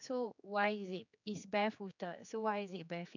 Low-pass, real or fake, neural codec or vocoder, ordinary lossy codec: 7.2 kHz; fake; codec, 16 kHz, 4 kbps, X-Codec, HuBERT features, trained on general audio; none